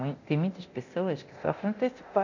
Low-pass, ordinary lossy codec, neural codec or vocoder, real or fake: 7.2 kHz; none; codec, 24 kHz, 0.9 kbps, DualCodec; fake